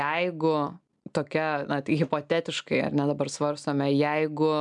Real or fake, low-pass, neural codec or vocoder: real; 10.8 kHz; none